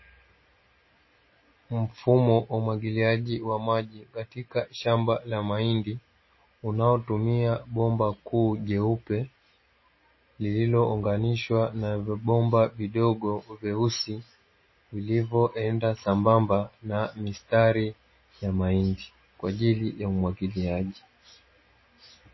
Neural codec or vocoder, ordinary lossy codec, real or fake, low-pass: none; MP3, 24 kbps; real; 7.2 kHz